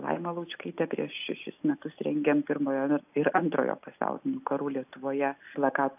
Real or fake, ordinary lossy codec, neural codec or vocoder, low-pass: real; AAC, 32 kbps; none; 3.6 kHz